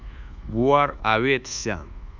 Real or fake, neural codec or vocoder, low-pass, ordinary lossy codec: fake; codec, 24 kHz, 1.2 kbps, DualCodec; 7.2 kHz; Opus, 64 kbps